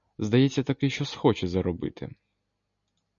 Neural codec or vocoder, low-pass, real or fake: none; 7.2 kHz; real